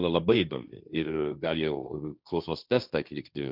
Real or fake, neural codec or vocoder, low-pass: fake; codec, 16 kHz, 1.1 kbps, Voila-Tokenizer; 5.4 kHz